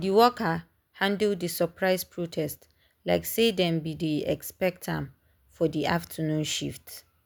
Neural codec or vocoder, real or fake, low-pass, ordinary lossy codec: none; real; none; none